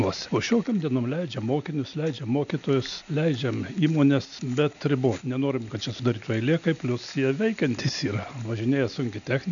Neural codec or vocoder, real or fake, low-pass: none; real; 7.2 kHz